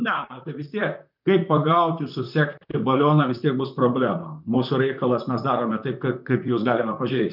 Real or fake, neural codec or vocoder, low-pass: fake; vocoder, 44.1 kHz, 128 mel bands, Pupu-Vocoder; 5.4 kHz